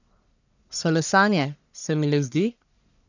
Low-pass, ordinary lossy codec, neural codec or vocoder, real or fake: 7.2 kHz; none; codec, 44.1 kHz, 1.7 kbps, Pupu-Codec; fake